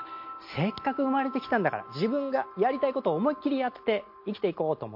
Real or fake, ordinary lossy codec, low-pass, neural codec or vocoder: real; MP3, 32 kbps; 5.4 kHz; none